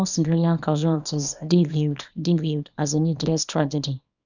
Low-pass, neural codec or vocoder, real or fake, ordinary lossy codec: 7.2 kHz; codec, 24 kHz, 0.9 kbps, WavTokenizer, small release; fake; none